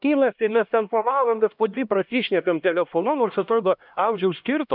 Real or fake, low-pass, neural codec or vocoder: fake; 5.4 kHz; codec, 16 kHz, 1 kbps, X-Codec, HuBERT features, trained on LibriSpeech